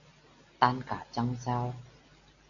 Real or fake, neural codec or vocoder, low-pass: real; none; 7.2 kHz